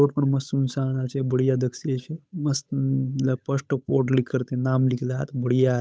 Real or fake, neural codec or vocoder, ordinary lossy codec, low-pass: fake; codec, 16 kHz, 8 kbps, FunCodec, trained on Chinese and English, 25 frames a second; none; none